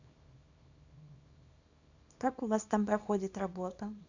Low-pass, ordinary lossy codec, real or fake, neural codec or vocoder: 7.2 kHz; Opus, 64 kbps; fake; codec, 24 kHz, 0.9 kbps, WavTokenizer, small release